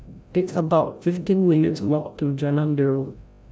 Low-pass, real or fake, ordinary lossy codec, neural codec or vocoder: none; fake; none; codec, 16 kHz, 0.5 kbps, FreqCodec, larger model